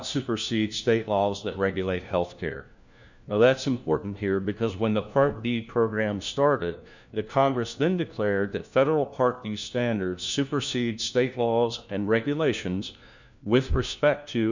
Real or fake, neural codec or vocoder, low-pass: fake; codec, 16 kHz, 1 kbps, FunCodec, trained on LibriTTS, 50 frames a second; 7.2 kHz